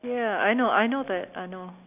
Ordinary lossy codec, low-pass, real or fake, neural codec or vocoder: none; 3.6 kHz; real; none